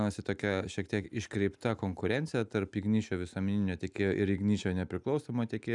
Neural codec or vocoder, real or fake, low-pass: none; real; 10.8 kHz